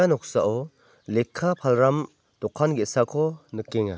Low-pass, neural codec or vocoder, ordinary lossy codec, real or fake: none; none; none; real